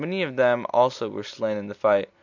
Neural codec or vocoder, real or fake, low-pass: none; real; 7.2 kHz